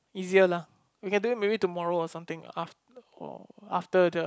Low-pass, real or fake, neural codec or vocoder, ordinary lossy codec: none; real; none; none